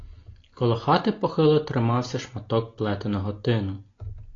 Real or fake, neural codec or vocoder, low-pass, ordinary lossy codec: real; none; 7.2 kHz; AAC, 32 kbps